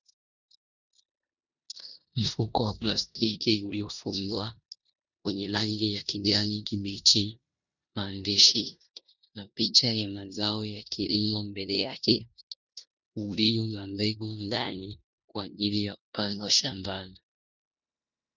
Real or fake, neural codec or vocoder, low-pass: fake; codec, 16 kHz in and 24 kHz out, 0.9 kbps, LongCat-Audio-Codec, four codebook decoder; 7.2 kHz